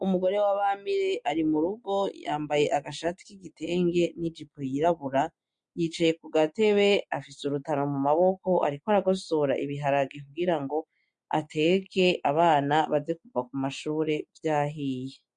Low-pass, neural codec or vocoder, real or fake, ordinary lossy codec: 9.9 kHz; none; real; MP3, 48 kbps